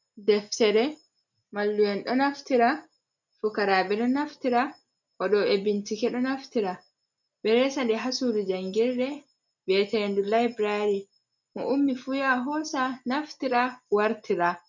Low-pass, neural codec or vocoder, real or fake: 7.2 kHz; none; real